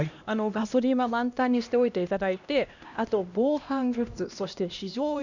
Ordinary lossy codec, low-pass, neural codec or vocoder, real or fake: none; 7.2 kHz; codec, 16 kHz, 1 kbps, X-Codec, HuBERT features, trained on LibriSpeech; fake